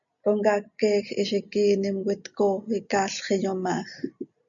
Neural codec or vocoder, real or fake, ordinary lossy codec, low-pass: none; real; MP3, 96 kbps; 7.2 kHz